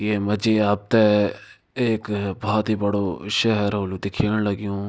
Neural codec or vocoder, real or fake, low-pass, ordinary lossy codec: none; real; none; none